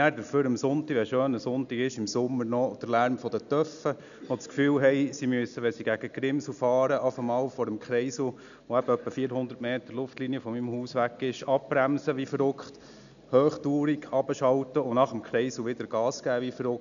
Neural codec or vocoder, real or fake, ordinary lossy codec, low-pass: none; real; none; 7.2 kHz